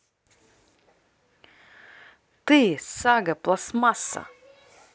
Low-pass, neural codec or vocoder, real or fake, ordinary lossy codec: none; none; real; none